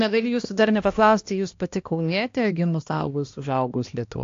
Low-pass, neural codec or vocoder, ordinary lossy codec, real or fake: 7.2 kHz; codec, 16 kHz, 1 kbps, X-Codec, HuBERT features, trained on balanced general audio; AAC, 48 kbps; fake